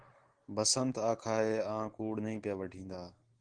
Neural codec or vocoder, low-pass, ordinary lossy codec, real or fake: none; 9.9 kHz; Opus, 16 kbps; real